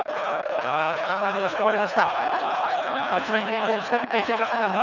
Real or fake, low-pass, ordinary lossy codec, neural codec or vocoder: fake; 7.2 kHz; none; codec, 24 kHz, 1.5 kbps, HILCodec